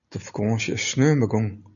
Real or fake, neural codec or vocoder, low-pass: real; none; 7.2 kHz